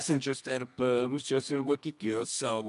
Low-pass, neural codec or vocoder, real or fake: 10.8 kHz; codec, 24 kHz, 0.9 kbps, WavTokenizer, medium music audio release; fake